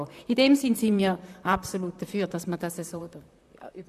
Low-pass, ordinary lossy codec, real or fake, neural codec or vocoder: 14.4 kHz; AAC, 96 kbps; fake; vocoder, 44.1 kHz, 128 mel bands, Pupu-Vocoder